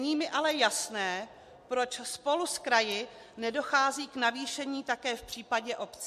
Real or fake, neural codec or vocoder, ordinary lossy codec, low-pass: real; none; MP3, 64 kbps; 14.4 kHz